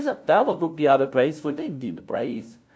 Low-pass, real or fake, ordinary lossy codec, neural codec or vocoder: none; fake; none; codec, 16 kHz, 0.5 kbps, FunCodec, trained on LibriTTS, 25 frames a second